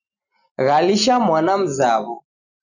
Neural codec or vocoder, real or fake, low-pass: none; real; 7.2 kHz